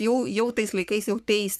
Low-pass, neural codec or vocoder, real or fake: 14.4 kHz; codec, 44.1 kHz, 3.4 kbps, Pupu-Codec; fake